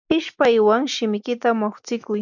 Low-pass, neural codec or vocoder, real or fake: 7.2 kHz; none; real